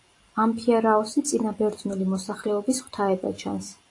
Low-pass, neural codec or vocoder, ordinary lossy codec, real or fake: 10.8 kHz; none; AAC, 48 kbps; real